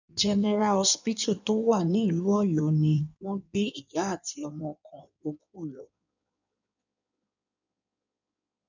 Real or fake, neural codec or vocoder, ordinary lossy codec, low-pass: fake; codec, 16 kHz in and 24 kHz out, 1.1 kbps, FireRedTTS-2 codec; none; 7.2 kHz